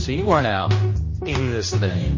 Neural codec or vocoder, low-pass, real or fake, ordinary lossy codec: codec, 16 kHz, 1 kbps, X-Codec, HuBERT features, trained on general audio; 7.2 kHz; fake; MP3, 32 kbps